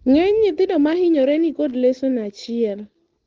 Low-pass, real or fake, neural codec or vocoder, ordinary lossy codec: 7.2 kHz; real; none; Opus, 16 kbps